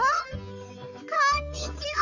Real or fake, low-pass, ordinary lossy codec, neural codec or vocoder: fake; 7.2 kHz; none; codec, 44.1 kHz, 3.4 kbps, Pupu-Codec